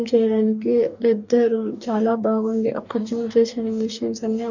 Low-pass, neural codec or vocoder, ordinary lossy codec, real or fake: 7.2 kHz; codec, 44.1 kHz, 2.6 kbps, DAC; none; fake